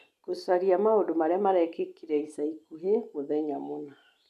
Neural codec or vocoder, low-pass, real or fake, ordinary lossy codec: none; 14.4 kHz; real; none